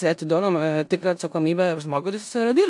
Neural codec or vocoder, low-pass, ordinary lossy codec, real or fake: codec, 16 kHz in and 24 kHz out, 0.9 kbps, LongCat-Audio-Codec, four codebook decoder; 10.8 kHz; AAC, 64 kbps; fake